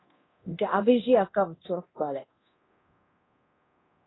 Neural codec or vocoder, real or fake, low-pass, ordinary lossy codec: codec, 24 kHz, 0.9 kbps, DualCodec; fake; 7.2 kHz; AAC, 16 kbps